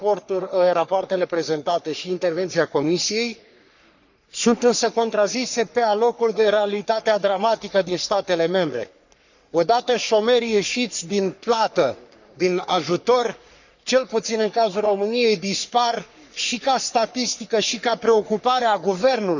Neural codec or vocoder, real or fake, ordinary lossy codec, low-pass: codec, 44.1 kHz, 3.4 kbps, Pupu-Codec; fake; none; 7.2 kHz